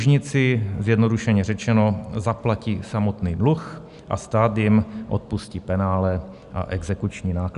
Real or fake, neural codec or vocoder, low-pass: real; none; 10.8 kHz